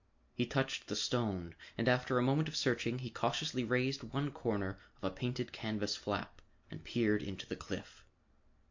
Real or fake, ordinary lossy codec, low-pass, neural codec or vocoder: real; MP3, 48 kbps; 7.2 kHz; none